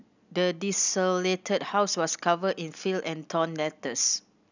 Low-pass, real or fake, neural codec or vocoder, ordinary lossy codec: 7.2 kHz; real; none; none